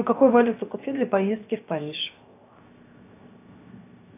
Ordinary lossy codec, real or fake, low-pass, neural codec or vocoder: AAC, 24 kbps; fake; 3.6 kHz; codec, 16 kHz, 0.7 kbps, FocalCodec